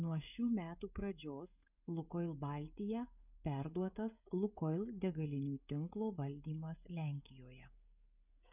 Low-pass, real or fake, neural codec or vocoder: 3.6 kHz; fake; codec, 16 kHz, 16 kbps, FreqCodec, smaller model